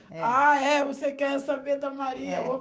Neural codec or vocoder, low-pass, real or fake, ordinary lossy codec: codec, 16 kHz, 6 kbps, DAC; none; fake; none